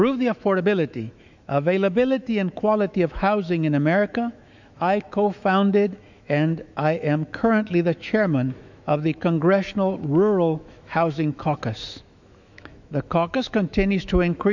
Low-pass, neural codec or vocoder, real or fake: 7.2 kHz; autoencoder, 48 kHz, 128 numbers a frame, DAC-VAE, trained on Japanese speech; fake